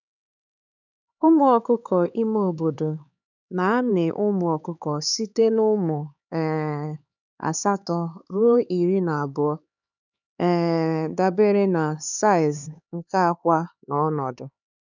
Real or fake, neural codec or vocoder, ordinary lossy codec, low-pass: fake; codec, 16 kHz, 4 kbps, X-Codec, HuBERT features, trained on LibriSpeech; none; 7.2 kHz